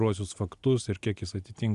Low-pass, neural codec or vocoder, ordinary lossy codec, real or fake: 10.8 kHz; none; Opus, 64 kbps; real